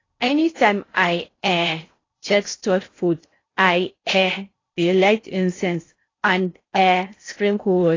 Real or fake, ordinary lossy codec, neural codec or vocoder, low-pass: fake; AAC, 32 kbps; codec, 16 kHz in and 24 kHz out, 0.6 kbps, FocalCodec, streaming, 4096 codes; 7.2 kHz